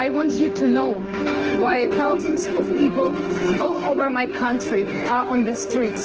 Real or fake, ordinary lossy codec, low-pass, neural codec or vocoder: fake; Opus, 16 kbps; 7.2 kHz; autoencoder, 48 kHz, 32 numbers a frame, DAC-VAE, trained on Japanese speech